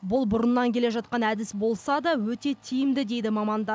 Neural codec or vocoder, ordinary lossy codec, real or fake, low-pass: none; none; real; none